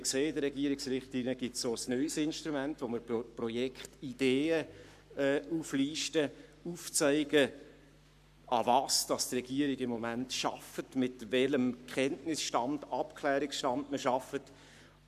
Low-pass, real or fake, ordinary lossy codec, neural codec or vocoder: 14.4 kHz; fake; none; codec, 44.1 kHz, 7.8 kbps, Pupu-Codec